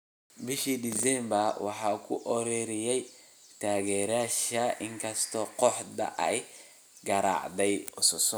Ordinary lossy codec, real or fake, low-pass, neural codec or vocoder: none; real; none; none